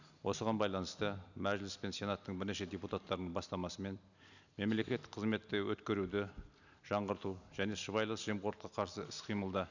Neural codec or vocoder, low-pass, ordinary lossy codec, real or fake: none; 7.2 kHz; none; real